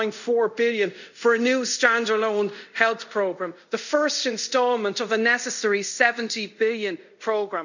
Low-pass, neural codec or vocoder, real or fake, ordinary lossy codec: 7.2 kHz; codec, 24 kHz, 0.5 kbps, DualCodec; fake; none